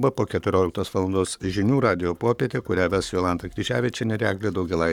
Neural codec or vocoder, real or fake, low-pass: codec, 44.1 kHz, 7.8 kbps, Pupu-Codec; fake; 19.8 kHz